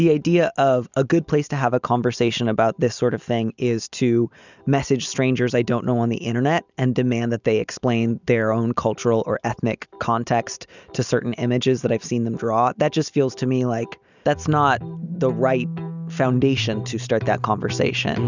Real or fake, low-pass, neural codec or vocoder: real; 7.2 kHz; none